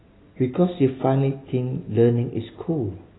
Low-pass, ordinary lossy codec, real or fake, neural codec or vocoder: 7.2 kHz; AAC, 16 kbps; real; none